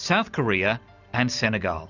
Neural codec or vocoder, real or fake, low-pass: none; real; 7.2 kHz